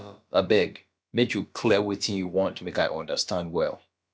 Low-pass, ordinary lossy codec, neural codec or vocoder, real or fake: none; none; codec, 16 kHz, about 1 kbps, DyCAST, with the encoder's durations; fake